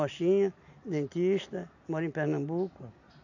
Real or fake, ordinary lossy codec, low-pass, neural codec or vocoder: real; none; 7.2 kHz; none